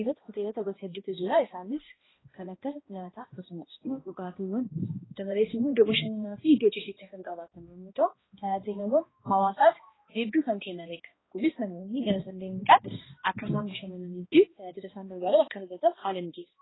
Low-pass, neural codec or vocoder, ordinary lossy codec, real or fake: 7.2 kHz; codec, 16 kHz, 1 kbps, X-Codec, HuBERT features, trained on balanced general audio; AAC, 16 kbps; fake